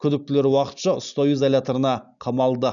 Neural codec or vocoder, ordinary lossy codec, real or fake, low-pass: none; none; real; 7.2 kHz